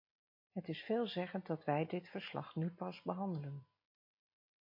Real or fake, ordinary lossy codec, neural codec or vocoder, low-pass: real; MP3, 32 kbps; none; 5.4 kHz